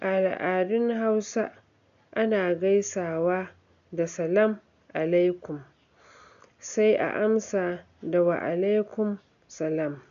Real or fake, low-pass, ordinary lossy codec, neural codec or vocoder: real; 7.2 kHz; MP3, 96 kbps; none